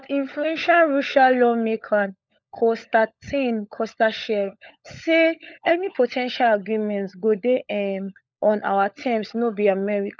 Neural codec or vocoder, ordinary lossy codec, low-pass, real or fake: codec, 16 kHz, 16 kbps, FunCodec, trained on LibriTTS, 50 frames a second; none; none; fake